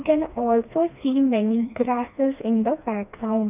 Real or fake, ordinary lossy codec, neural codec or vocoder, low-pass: fake; none; codec, 16 kHz, 2 kbps, FreqCodec, smaller model; 3.6 kHz